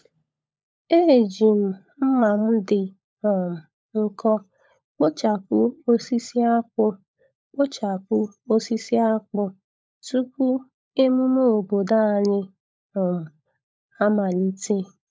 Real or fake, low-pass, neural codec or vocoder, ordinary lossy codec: fake; none; codec, 16 kHz, 16 kbps, FunCodec, trained on LibriTTS, 50 frames a second; none